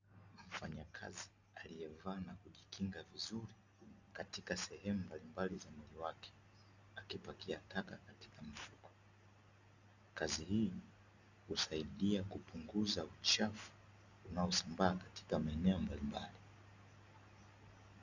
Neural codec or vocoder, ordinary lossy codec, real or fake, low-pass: none; Opus, 64 kbps; real; 7.2 kHz